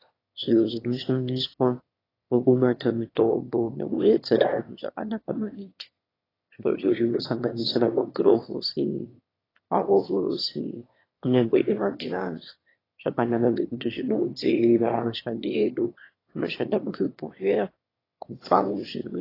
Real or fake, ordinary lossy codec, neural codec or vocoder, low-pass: fake; AAC, 24 kbps; autoencoder, 22.05 kHz, a latent of 192 numbers a frame, VITS, trained on one speaker; 5.4 kHz